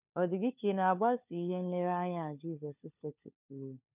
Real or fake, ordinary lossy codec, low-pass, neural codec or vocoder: fake; none; 3.6 kHz; codec, 16 kHz, 4 kbps, FunCodec, trained on LibriTTS, 50 frames a second